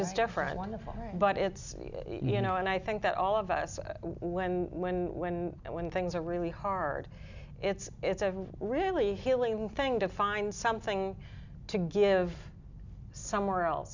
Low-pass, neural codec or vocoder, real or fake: 7.2 kHz; none; real